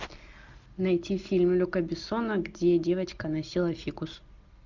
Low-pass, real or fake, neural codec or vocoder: 7.2 kHz; real; none